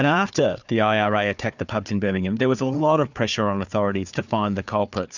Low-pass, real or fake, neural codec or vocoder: 7.2 kHz; fake; codec, 16 kHz, 4 kbps, FunCodec, trained on Chinese and English, 50 frames a second